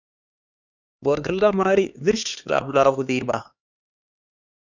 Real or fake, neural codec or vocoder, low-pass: fake; codec, 16 kHz, 2 kbps, X-Codec, HuBERT features, trained on LibriSpeech; 7.2 kHz